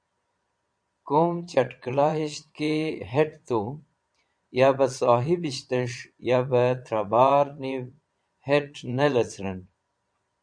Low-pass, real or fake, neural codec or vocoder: 9.9 kHz; fake; vocoder, 22.05 kHz, 80 mel bands, Vocos